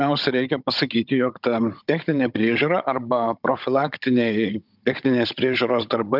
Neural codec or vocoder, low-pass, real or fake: codec, 16 kHz, 16 kbps, FunCodec, trained on Chinese and English, 50 frames a second; 5.4 kHz; fake